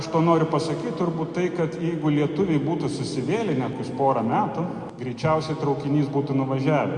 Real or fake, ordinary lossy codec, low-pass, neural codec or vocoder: real; Opus, 64 kbps; 10.8 kHz; none